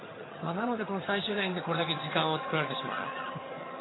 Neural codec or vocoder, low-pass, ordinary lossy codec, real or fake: vocoder, 22.05 kHz, 80 mel bands, HiFi-GAN; 7.2 kHz; AAC, 16 kbps; fake